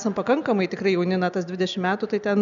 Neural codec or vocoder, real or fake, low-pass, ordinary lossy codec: none; real; 7.2 kHz; MP3, 96 kbps